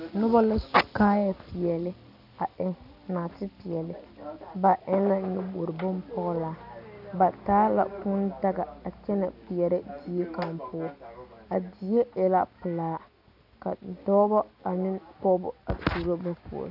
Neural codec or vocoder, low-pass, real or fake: none; 5.4 kHz; real